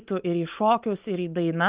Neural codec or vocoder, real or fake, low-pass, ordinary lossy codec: none; real; 3.6 kHz; Opus, 32 kbps